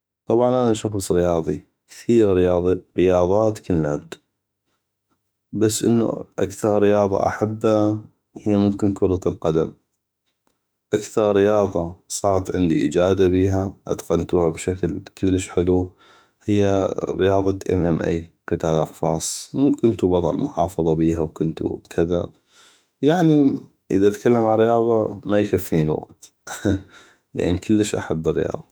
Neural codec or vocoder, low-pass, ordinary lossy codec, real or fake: autoencoder, 48 kHz, 32 numbers a frame, DAC-VAE, trained on Japanese speech; none; none; fake